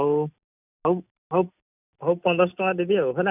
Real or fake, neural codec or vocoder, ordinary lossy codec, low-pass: real; none; none; 3.6 kHz